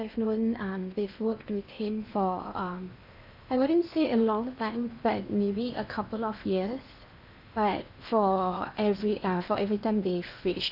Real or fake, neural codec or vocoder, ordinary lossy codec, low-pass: fake; codec, 16 kHz in and 24 kHz out, 0.8 kbps, FocalCodec, streaming, 65536 codes; none; 5.4 kHz